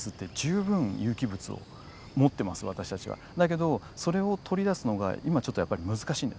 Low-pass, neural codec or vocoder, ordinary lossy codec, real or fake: none; none; none; real